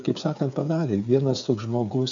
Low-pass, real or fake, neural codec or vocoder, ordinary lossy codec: 7.2 kHz; fake; codec, 16 kHz, 8 kbps, FreqCodec, smaller model; AAC, 64 kbps